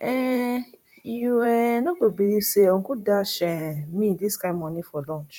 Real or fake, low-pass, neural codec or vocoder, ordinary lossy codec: fake; 19.8 kHz; vocoder, 44.1 kHz, 128 mel bands, Pupu-Vocoder; none